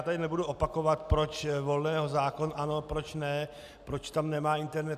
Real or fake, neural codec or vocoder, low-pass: real; none; 14.4 kHz